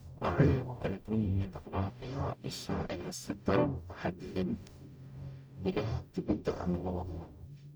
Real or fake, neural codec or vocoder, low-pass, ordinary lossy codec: fake; codec, 44.1 kHz, 0.9 kbps, DAC; none; none